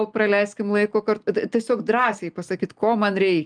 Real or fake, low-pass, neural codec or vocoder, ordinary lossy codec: real; 9.9 kHz; none; Opus, 32 kbps